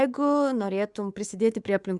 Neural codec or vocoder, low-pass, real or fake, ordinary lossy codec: codec, 24 kHz, 3.1 kbps, DualCodec; 10.8 kHz; fake; Opus, 64 kbps